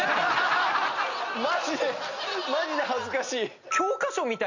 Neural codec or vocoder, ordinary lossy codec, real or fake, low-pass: none; none; real; 7.2 kHz